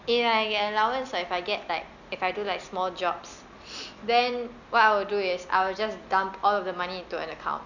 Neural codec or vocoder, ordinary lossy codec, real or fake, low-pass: none; none; real; 7.2 kHz